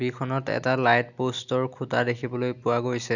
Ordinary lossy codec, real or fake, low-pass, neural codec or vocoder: none; real; 7.2 kHz; none